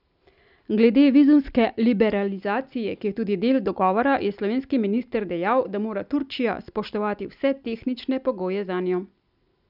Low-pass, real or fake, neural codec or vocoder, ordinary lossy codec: 5.4 kHz; real; none; none